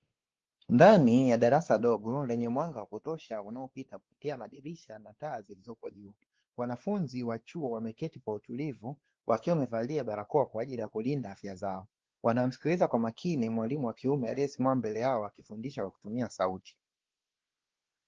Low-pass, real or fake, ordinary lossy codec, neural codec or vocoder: 7.2 kHz; fake; Opus, 16 kbps; codec, 16 kHz, 2 kbps, X-Codec, WavLM features, trained on Multilingual LibriSpeech